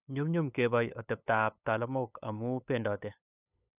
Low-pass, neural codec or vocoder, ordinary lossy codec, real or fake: 3.6 kHz; codec, 16 kHz, 8 kbps, FunCodec, trained on LibriTTS, 25 frames a second; none; fake